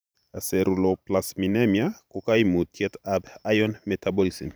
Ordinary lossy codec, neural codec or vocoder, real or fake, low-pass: none; vocoder, 44.1 kHz, 128 mel bands every 512 samples, BigVGAN v2; fake; none